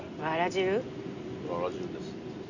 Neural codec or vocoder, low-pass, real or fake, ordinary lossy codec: none; 7.2 kHz; real; Opus, 64 kbps